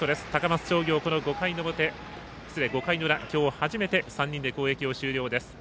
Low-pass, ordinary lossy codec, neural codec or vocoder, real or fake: none; none; none; real